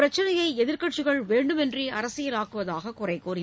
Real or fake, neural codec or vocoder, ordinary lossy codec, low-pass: real; none; none; none